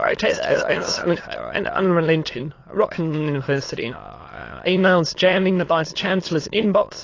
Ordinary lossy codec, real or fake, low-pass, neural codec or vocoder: AAC, 32 kbps; fake; 7.2 kHz; autoencoder, 22.05 kHz, a latent of 192 numbers a frame, VITS, trained on many speakers